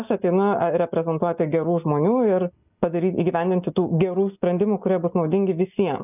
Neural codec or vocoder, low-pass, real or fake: none; 3.6 kHz; real